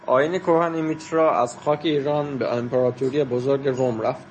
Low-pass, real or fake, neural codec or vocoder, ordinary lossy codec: 10.8 kHz; real; none; MP3, 32 kbps